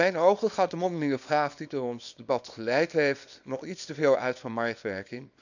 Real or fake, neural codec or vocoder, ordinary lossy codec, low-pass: fake; codec, 24 kHz, 0.9 kbps, WavTokenizer, small release; none; 7.2 kHz